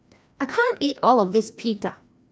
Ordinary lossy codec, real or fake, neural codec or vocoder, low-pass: none; fake; codec, 16 kHz, 1 kbps, FreqCodec, larger model; none